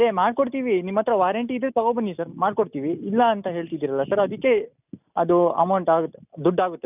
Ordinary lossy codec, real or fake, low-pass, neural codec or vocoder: none; real; 3.6 kHz; none